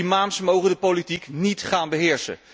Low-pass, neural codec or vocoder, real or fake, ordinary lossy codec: none; none; real; none